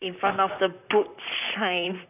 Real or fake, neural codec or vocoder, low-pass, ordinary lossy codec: fake; vocoder, 44.1 kHz, 128 mel bands, Pupu-Vocoder; 3.6 kHz; none